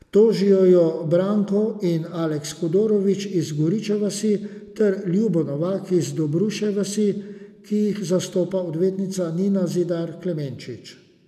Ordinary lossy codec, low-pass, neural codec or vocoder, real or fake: none; 14.4 kHz; none; real